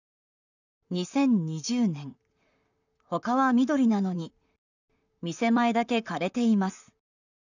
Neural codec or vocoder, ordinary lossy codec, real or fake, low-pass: vocoder, 44.1 kHz, 128 mel bands, Pupu-Vocoder; none; fake; 7.2 kHz